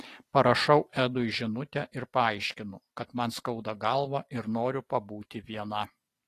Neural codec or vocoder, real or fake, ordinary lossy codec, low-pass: none; real; AAC, 48 kbps; 14.4 kHz